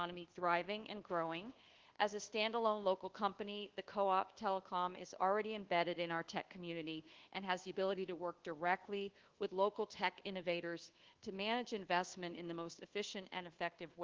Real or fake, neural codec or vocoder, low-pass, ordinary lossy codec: fake; codec, 24 kHz, 1.2 kbps, DualCodec; 7.2 kHz; Opus, 16 kbps